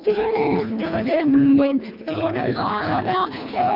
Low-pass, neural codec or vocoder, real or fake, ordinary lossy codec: 5.4 kHz; codec, 24 kHz, 1.5 kbps, HILCodec; fake; MP3, 48 kbps